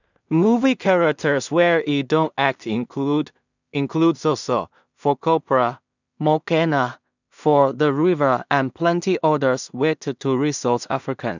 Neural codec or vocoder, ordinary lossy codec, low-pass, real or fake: codec, 16 kHz in and 24 kHz out, 0.4 kbps, LongCat-Audio-Codec, two codebook decoder; none; 7.2 kHz; fake